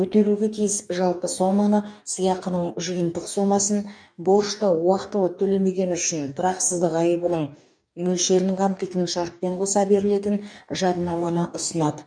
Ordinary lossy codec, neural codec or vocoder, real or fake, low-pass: none; codec, 44.1 kHz, 2.6 kbps, DAC; fake; 9.9 kHz